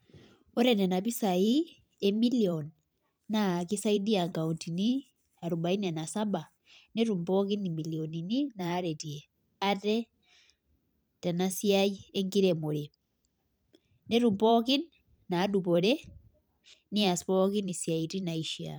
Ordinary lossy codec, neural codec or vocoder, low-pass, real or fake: none; vocoder, 44.1 kHz, 128 mel bands every 512 samples, BigVGAN v2; none; fake